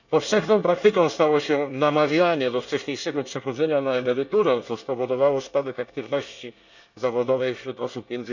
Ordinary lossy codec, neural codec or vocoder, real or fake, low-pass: none; codec, 24 kHz, 1 kbps, SNAC; fake; 7.2 kHz